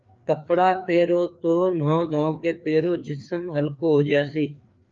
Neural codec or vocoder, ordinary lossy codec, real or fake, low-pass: codec, 16 kHz, 2 kbps, FreqCodec, larger model; Opus, 32 kbps; fake; 7.2 kHz